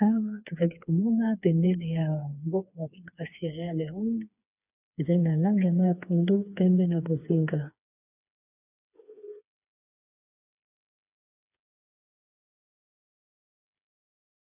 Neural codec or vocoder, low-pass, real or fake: codec, 16 kHz, 4 kbps, FreqCodec, smaller model; 3.6 kHz; fake